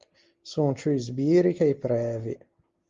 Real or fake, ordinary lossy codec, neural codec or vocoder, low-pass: real; Opus, 32 kbps; none; 7.2 kHz